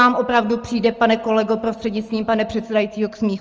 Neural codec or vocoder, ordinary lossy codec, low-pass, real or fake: none; Opus, 24 kbps; 7.2 kHz; real